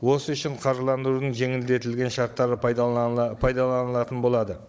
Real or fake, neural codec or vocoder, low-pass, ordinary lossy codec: fake; codec, 16 kHz, 16 kbps, FunCodec, trained on Chinese and English, 50 frames a second; none; none